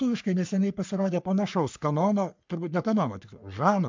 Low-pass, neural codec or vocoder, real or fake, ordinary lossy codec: 7.2 kHz; codec, 44.1 kHz, 3.4 kbps, Pupu-Codec; fake; MP3, 64 kbps